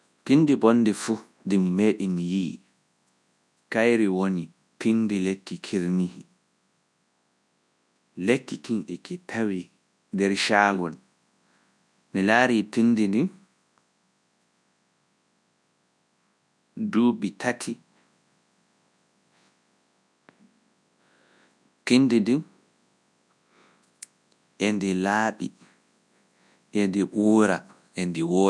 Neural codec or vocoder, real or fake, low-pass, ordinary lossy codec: codec, 24 kHz, 0.9 kbps, WavTokenizer, large speech release; fake; none; none